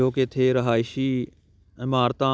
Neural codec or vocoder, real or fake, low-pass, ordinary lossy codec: none; real; none; none